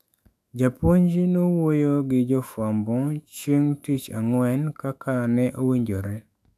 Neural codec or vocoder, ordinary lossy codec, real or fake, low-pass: autoencoder, 48 kHz, 128 numbers a frame, DAC-VAE, trained on Japanese speech; AAC, 96 kbps; fake; 14.4 kHz